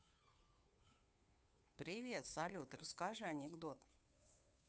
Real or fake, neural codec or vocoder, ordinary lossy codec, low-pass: fake; codec, 16 kHz, 2 kbps, FunCodec, trained on Chinese and English, 25 frames a second; none; none